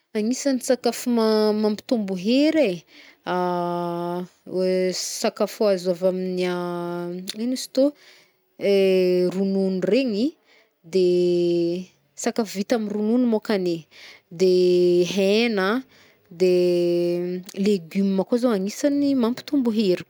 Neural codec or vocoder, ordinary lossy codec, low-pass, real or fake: none; none; none; real